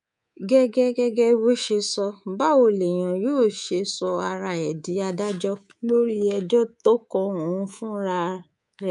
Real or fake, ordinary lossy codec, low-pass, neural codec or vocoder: fake; none; 10.8 kHz; codec, 24 kHz, 3.1 kbps, DualCodec